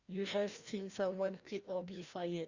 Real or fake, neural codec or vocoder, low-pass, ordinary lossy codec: fake; codec, 16 kHz, 1 kbps, FreqCodec, larger model; 7.2 kHz; Opus, 64 kbps